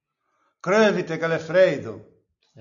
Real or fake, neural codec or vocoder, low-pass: real; none; 7.2 kHz